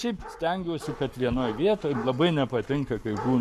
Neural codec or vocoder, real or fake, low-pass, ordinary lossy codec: codec, 44.1 kHz, 7.8 kbps, Pupu-Codec; fake; 14.4 kHz; MP3, 96 kbps